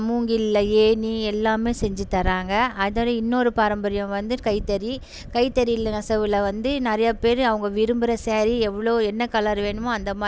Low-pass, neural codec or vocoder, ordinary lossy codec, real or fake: none; none; none; real